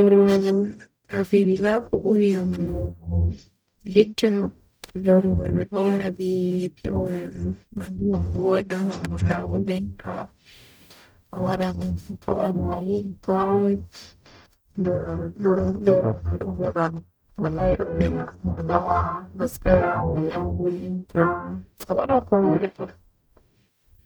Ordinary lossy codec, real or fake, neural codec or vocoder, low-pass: none; fake; codec, 44.1 kHz, 0.9 kbps, DAC; none